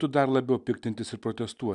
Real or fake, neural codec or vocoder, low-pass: real; none; 10.8 kHz